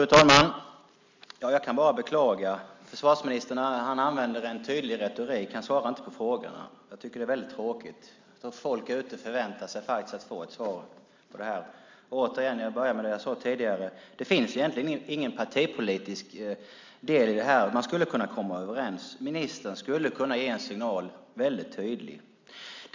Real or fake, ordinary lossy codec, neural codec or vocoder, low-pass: real; MP3, 64 kbps; none; 7.2 kHz